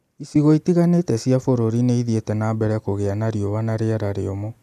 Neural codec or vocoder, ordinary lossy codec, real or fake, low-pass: none; none; real; 14.4 kHz